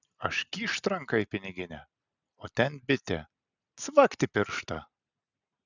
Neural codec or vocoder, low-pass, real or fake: none; 7.2 kHz; real